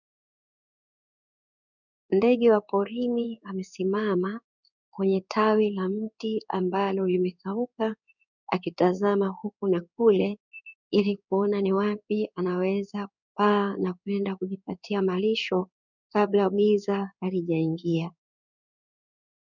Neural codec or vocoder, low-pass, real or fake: codec, 16 kHz in and 24 kHz out, 1 kbps, XY-Tokenizer; 7.2 kHz; fake